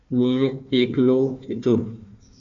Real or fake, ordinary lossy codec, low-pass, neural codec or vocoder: fake; AAC, 64 kbps; 7.2 kHz; codec, 16 kHz, 1 kbps, FunCodec, trained on Chinese and English, 50 frames a second